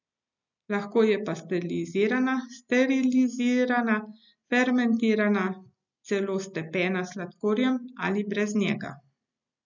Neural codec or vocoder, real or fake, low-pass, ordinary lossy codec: none; real; 7.2 kHz; none